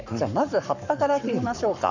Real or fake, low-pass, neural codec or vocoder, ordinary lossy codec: fake; 7.2 kHz; codec, 44.1 kHz, 7.8 kbps, Pupu-Codec; none